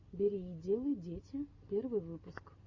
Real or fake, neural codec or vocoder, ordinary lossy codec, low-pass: real; none; Opus, 64 kbps; 7.2 kHz